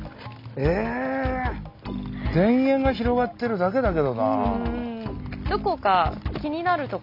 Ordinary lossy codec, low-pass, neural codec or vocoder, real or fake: none; 5.4 kHz; none; real